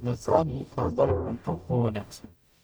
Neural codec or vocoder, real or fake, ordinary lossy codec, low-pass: codec, 44.1 kHz, 0.9 kbps, DAC; fake; none; none